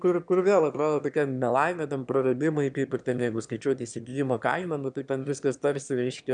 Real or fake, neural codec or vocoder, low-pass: fake; autoencoder, 22.05 kHz, a latent of 192 numbers a frame, VITS, trained on one speaker; 9.9 kHz